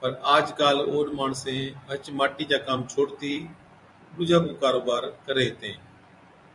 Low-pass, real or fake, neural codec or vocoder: 10.8 kHz; fake; vocoder, 24 kHz, 100 mel bands, Vocos